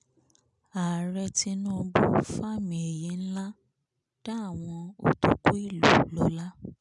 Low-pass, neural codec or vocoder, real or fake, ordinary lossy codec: 10.8 kHz; none; real; none